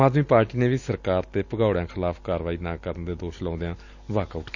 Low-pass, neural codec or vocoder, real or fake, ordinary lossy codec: 7.2 kHz; none; real; Opus, 64 kbps